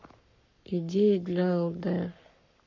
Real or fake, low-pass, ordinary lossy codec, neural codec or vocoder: fake; 7.2 kHz; MP3, 64 kbps; codec, 44.1 kHz, 7.8 kbps, Pupu-Codec